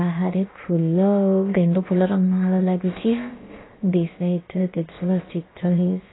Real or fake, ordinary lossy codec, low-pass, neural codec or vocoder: fake; AAC, 16 kbps; 7.2 kHz; codec, 16 kHz, about 1 kbps, DyCAST, with the encoder's durations